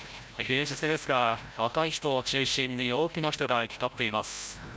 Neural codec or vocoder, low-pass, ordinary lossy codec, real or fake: codec, 16 kHz, 0.5 kbps, FreqCodec, larger model; none; none; fake